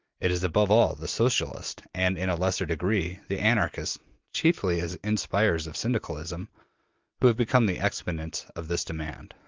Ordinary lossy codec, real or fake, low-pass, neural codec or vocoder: Opus, 32 kbps; real; 7.2 kHz; none